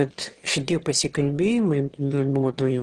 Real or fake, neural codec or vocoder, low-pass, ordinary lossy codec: fake; autoencoder, 22.05 kHz, a latent of 192 numbers a frame, VITS, trained on one speaker; 9.9 kHz; Opus, 16 kbps